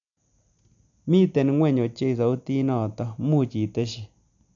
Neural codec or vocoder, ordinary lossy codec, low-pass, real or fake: none; MP3, 64 kbps; 7.2 kHz; real